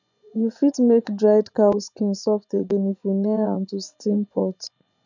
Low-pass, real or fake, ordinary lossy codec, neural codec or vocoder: 7.2 kHz; fake; none; vocoder, 24 kHz, 100 mel bands, Vocos